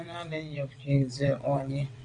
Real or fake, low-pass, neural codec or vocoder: fake; 9.9 kHz; vocoder, 22.05 kHz, 80 mel bands, WaveNeXt